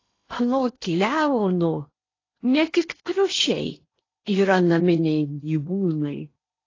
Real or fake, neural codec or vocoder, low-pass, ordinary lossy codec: fake; codec, 16 kHz in and 24 kHz out, 0.8 kbps, FocalCodec, streaming, 65536 codes; 7.2 kHz; AAC, 32 kbps